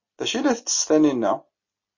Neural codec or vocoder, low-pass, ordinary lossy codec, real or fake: none; 7.2 kHz; MP3, 32 kbps; real